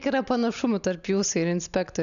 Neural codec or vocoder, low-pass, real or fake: none; 7.2 kHz; real